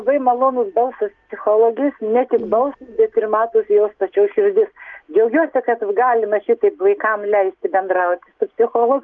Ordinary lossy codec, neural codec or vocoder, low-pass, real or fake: Opus, 32 kbps; none; 7.2 kHz; real